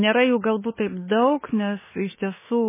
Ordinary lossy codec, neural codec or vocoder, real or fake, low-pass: MP3, 16 kbps; autoencoder, 48 kHz, 32 numbers a frame, DAC-VAE, trained on Japanese speech; fake; 3.6 kHz